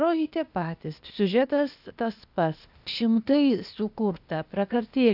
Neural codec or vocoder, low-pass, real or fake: codec, 16 kHz, 0.8 kbps, ZipCodec; 5.4 kHz; fake